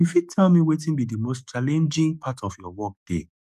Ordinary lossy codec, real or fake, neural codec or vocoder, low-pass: none; fake; codec, 44.1 kHz, 7.8 kbps, DAC; 14.4 kHz